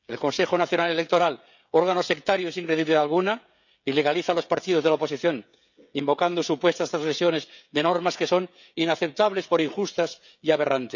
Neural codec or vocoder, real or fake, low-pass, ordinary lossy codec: codec, 16 kHz, 16 kbps, FreqCodec, smaller model; fake; 7.2 kHz; none